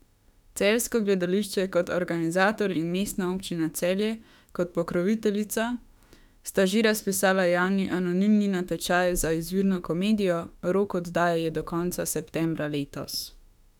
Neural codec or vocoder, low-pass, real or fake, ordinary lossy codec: autoencoder, 48 kHz, 32 numbers a frame, DAC-VAE, trained on Japanese speech; 19.8 kHz; fake; none